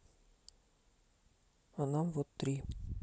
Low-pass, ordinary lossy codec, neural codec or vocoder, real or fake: none; none; none; real